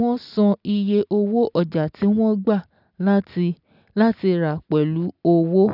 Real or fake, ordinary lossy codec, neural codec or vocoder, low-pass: real; none; none; 5.4 kHz